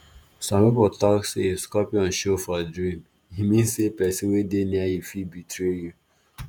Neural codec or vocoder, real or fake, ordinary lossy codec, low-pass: vocoder, 48 kHz, 128 mel bands, Vocos; fake; none; none